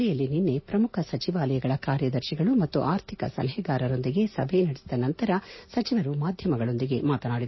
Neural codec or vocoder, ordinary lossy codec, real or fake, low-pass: none; MP3, 24 kbps; real; 7.2 kHz